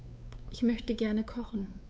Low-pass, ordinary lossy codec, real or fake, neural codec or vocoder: none; none; fake; codec, 16 kHz, 4 kbps, X-Codec, WavLM features, trained on Multilingual LibriSpeech